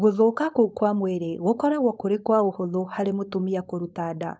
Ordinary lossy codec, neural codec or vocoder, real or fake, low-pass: none; codec, 16 kHz, 4.8 kbps, FACodec; fake; none